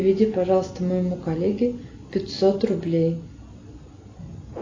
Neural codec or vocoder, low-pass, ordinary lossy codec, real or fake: none; 7.2 kHz; AAC, 32 kbps; real